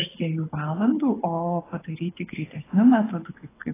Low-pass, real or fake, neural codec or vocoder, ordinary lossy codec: 3.6 kHz; fake; codec, 24 kHz, 6 kbps, HILCodec; AAC, 16 kbps